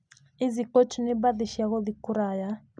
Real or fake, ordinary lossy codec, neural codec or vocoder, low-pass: real; none; none; 9.9 kHz